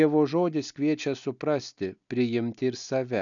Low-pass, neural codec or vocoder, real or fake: 7.2 kHz; none; real